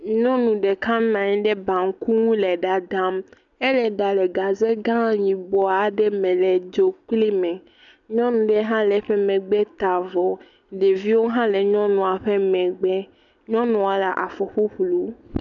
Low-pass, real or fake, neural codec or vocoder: 7.2 kHz; real; none